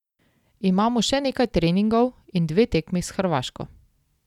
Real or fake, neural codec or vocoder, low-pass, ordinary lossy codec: real; none; 19.8 kHz; none